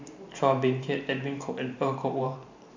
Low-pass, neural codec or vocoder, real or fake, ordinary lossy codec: 7.2 kHz; vocoder, 44.1 kHz, 128 mel bands every 512 samples, BigVGAN v2; fake; MP3, 64 kbps